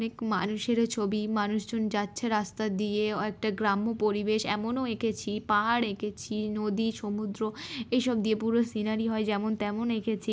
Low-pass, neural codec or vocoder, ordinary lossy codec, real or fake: none; none; none; real